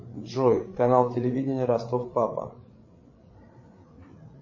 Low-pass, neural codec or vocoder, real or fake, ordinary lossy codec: 7.2 kHz; codec, 16 kHz, 8 kbps, FreqCodec, larger model; fake; MP3, 32 kbps